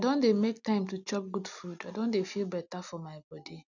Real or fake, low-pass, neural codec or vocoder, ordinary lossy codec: real; 7.2 kHz; none; none